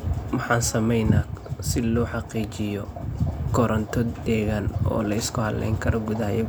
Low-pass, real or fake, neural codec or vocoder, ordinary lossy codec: none; real; none; none